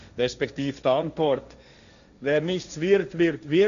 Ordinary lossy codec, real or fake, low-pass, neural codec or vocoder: MP3, 96 kbps; fake; 7.2 kHz; codec, 16 kHz, 1.1 kbps, Voila-Tokenizer